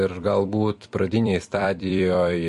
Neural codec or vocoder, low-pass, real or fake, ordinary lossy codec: vocoder, 44.1 kHz, 128 mel bands every 256 samples, BigVGAN v2; 14.4 kHz; fake; MP3, 48 kbps